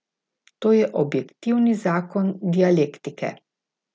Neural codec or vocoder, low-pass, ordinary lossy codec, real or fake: none; none; none; real